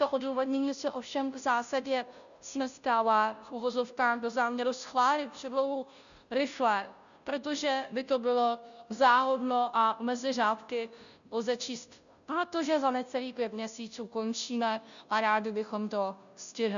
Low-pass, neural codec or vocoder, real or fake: 7.2 kHz; codec, 16 kHz, 0.5 kbps, FunCodec, trained on Chinese and English, 25 frames a second; fake